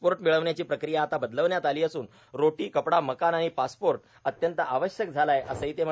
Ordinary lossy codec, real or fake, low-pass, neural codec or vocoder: none; real; none; none